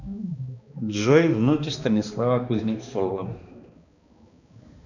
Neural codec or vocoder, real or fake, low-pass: codec, 16 kHz, 2 kbps, X-Codec, HuBERT features, trained on balanced general audio; fake; 7.2 kHz